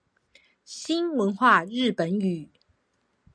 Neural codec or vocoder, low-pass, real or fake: none; 9.9 kHz; real